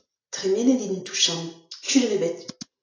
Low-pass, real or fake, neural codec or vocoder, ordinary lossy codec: 7.2 kHz; real; none; AAC, 32 kbps